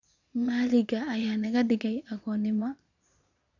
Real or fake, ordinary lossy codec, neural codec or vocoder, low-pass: fake; none; vocoder, 22.05 kHz, 80 mel bands, Vocos; 7.2 kHz